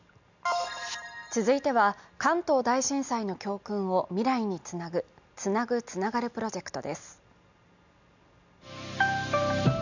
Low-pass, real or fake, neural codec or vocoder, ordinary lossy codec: 7.2 kHz; real; none; none